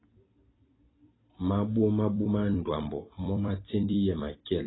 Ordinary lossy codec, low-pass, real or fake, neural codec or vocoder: AAC, 16 kbps; 7.2 kHz; fake; vocoder, 44.1 kHz, 128 mel bands every 256 samples, BigVGAN v2